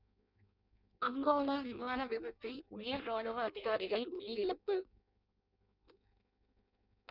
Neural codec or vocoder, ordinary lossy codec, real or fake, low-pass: codec, 16 kHz in and 24 kHz out, 0.6 kbps, FireRedTTS-2 codec; none; fake; 5.4 kHz